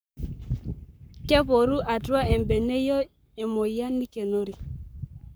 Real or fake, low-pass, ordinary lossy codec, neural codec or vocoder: fake; none; none; codec, 44.1 kHz, 7.8 kbps, Pupu-Codec